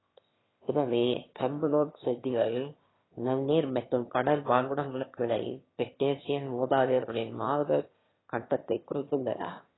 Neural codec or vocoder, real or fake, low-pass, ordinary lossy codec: autoencoder, 22.05 kHz, a latent of 192 numbers a frame, VITS, trained on one speaker; fake; 7.2 kHz; AAC, 16 kbps